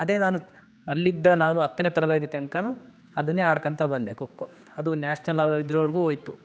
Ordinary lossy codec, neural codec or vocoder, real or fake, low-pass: none; codec, 16 kHz, 2 kbps, X-Codec, HuBERT features, trained on general audio; fake; none